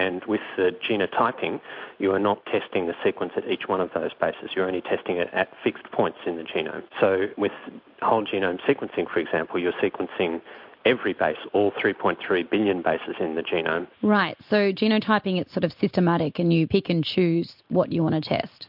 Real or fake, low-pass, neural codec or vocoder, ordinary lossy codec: real; 5.4 kHz; none; MP3, 48 kbps